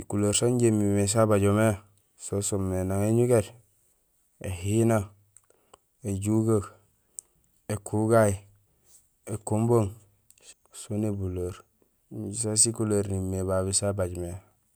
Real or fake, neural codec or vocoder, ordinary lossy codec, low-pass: real; none; none; none